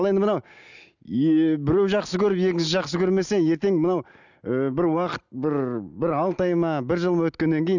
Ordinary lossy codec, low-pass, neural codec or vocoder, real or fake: none; 7.2 kHz; none; real